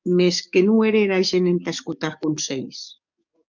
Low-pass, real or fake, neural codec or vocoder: 7.2 kHz; fake; codec, 44.1 kHz, 7.8 kbps, DAC